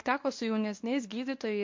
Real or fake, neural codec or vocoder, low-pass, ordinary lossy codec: fake; codec, 24 kHz, 0.9 kbps, WavTokenizer, medium speech release version 1; 7.2 kHz; MP3, 48 kbps